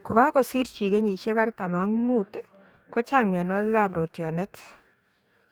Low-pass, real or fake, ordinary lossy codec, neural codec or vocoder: none; fake; none; codec, 44.1 kHz, 2.6 kbps, DAC